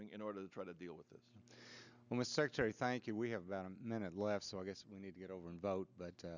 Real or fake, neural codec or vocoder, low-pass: real; none; 7.2 kHz